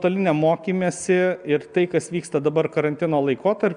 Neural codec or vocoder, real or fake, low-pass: none; real; 9.9 kHz